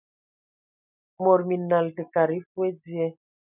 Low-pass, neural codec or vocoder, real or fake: 3.6 kHz; none; real